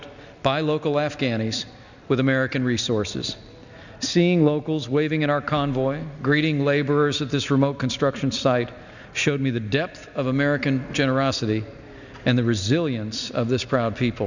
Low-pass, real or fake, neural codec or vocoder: 7.2 kHz; real; none